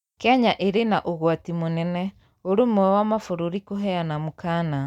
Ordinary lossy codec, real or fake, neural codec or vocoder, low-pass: none; real; none; 19.8 kHz